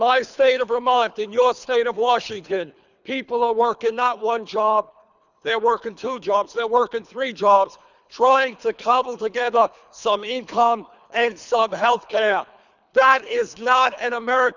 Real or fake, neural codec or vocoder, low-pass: fake; codec, 24 kHz, 3 kbps, HILCodec; 7.2 kHz